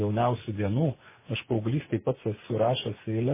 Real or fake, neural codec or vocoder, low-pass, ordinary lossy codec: fake; vocoder, 24 kHz, 100 mel bands, Vocos; 3.6 kHz; MP3, 16 kbps